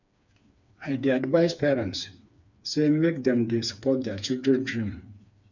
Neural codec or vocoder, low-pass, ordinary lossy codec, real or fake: codec, 16 kHz, 4 kbps, FreqCodec, smaller model; 7.2 kHz; none; fake